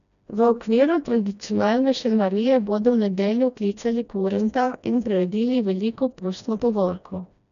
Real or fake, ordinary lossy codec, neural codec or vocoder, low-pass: fake; none; codec, 16 kHz, 1 kbps, FreqCodec, smaller model; 7.2 kHz